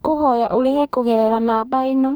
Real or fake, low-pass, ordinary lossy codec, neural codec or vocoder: fake; none; none; codec, 44.1 kHz, 2.6 kbps, DAC